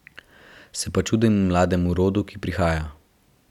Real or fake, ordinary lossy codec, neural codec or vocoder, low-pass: real; none; none; 19.8 kHz